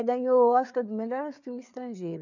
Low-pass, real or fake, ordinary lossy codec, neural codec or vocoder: 7.2 kHz; fake; none; codec, 16 kHz, 4 kbps, FreqCodec, larger model